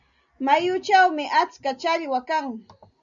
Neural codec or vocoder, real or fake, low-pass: none; real; 7.2 kHz